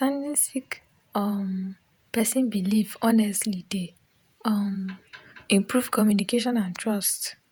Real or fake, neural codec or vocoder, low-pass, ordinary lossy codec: fake; vocoder, 48 kHz, 128 mel bands, Vocos; none; none